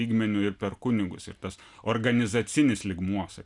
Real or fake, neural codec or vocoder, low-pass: real; none; 10.8 kHz